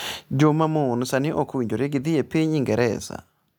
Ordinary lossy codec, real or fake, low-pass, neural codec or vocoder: none; real; none; none